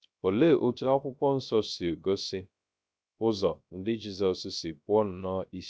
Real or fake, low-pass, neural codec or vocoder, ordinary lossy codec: fake; none; codec, 16 kHz, 0.3 kbps, FocalCodec; none